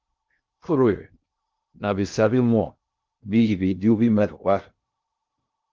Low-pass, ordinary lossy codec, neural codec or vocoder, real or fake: 7.2 kHz; Opus, 32 kbps; codec, 16 kHz in and 24 kHz out, 0.6 kbps, FocalCodec, streaming, 2048 codes; fake